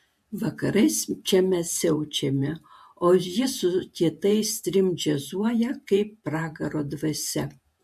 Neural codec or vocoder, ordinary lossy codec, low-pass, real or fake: vocoder, 48 kHz, 128 mel bands, Vocos; MP3, 64 kbps; 14.4 kHz; fake